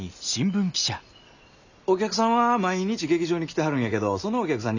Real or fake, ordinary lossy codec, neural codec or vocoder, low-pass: real; none; none; 7.2 kHz